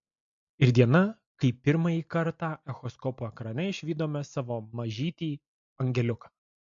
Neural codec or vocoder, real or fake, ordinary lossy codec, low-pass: none; real; MP3, 48 kbps; 7.2 kHz